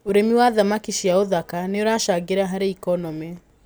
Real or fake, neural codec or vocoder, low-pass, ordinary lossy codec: real; none; none; none